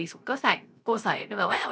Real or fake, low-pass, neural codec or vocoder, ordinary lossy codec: fake; none; codec, 16 kHz, 0.3 kbps, FocalCodec; none